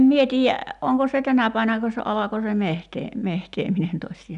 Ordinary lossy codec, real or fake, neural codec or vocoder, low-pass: none; real; none; 10.8 kHz